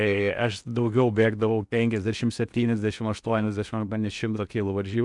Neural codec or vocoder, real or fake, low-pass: codec, 16 kHz in and 24 kHz out, 0.8 kbps, FocalCodec, streaming, 65536 codes; fake; 10.8 kHz